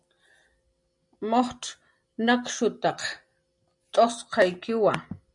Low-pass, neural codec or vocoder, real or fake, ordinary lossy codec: 10.8 kHz; none; real; MP3, 64 kbps